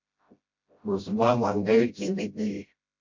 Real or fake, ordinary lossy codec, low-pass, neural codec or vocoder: fake; MP3, 48 kbps; 7.2 kHz; codec, 16 kHz, 0.5 kbps, FreqCodec, smaller model